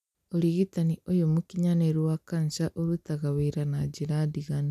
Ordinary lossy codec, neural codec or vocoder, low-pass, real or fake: none; none; 14.4 kHz; real